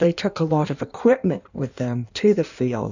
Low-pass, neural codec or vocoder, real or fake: 7.2 kHz; codec, 16 kHz in and 24 kHz out, 1.1 kbps, FireRedTTS-2 codec; fake